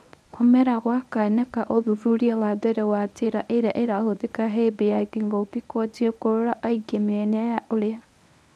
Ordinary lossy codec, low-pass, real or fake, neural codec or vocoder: none; none; fake; codec, 24 kHz, 0.9 kbps, WavTokenizer, medium speech release version 1